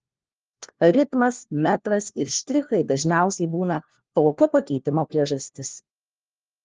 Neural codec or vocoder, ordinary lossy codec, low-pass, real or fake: codec, 16 kHz, 1 kbps, FunCodec, trained on LibriTTS, 50 frames a second; Opus, 16 kbps; 7.2 kHz; fake